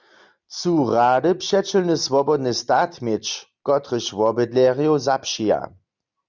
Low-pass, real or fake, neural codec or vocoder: 7.2 kHz; real; none